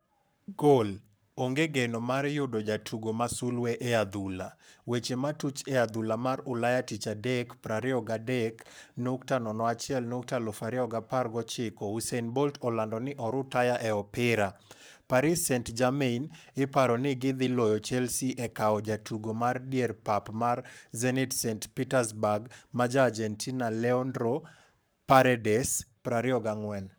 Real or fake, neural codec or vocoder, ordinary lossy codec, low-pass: fake; codec, 44.1 kHz, 7.8 kbps, Pupu-Codec; none; none